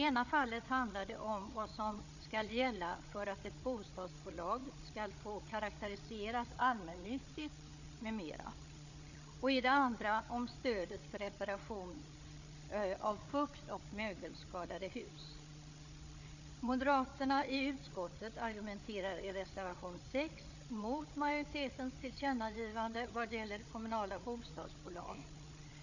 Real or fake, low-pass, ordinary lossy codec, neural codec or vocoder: fake; 7.2 kHz; none; codec, 16 kHz, 8 kbps, FreqCodec, larger model